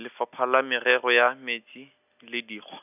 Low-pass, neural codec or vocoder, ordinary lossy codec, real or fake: 3.6 kHz; none; none; real